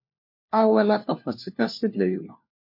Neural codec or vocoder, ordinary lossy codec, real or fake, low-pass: codec, 16 kHz, 1 kbps, FunCodec, trained on LibriTTS, 50 frames a second; MP3, 32 kbps; fake; 5.4 kHz